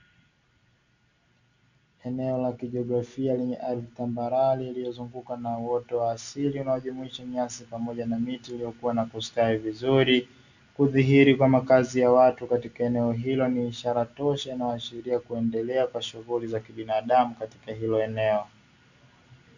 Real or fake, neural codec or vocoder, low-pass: real; none; 7.2 kHz